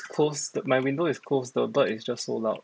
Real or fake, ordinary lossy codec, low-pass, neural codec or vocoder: real; none; none; none